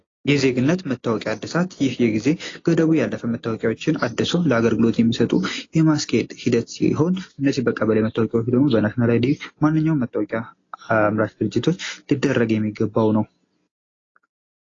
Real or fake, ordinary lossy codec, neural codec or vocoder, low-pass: real; AAC, 32 kbps; none; 7.2 kHz